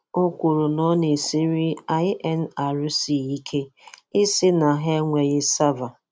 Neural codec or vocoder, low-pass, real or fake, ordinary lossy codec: none; none; real; none